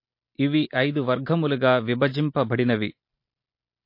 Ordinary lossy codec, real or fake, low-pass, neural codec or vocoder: MP3, 32 kbps; real; 5.4 kHz; none